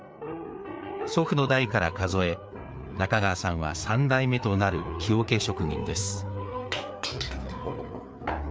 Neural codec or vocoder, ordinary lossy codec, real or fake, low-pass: codec, 16 kHz, 4 kbps, FreqCodec, larger model; none; fake; none